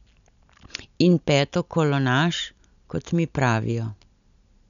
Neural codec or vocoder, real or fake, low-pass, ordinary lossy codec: none; real; 7.2 kHz; none